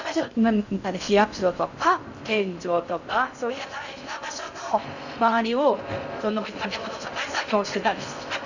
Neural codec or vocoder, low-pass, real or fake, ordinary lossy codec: codec, 16 kHz in and 24 kHz out, 0.6 kbps, FocalCodec, streaming, 4096 codes; 7.2 kHz; fake; none